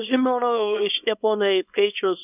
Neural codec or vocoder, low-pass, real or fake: codec, 24 kHz, 0.9 kbps, WavTokenizer, small release; 3.6 kHz; fake